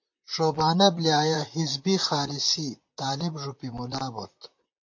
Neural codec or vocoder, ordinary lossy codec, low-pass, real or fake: vocoder, 44.1 kHz, 128 mel bands, Pupu-Vocoder; MP3, 48 kbps; 7.2 kHz; fake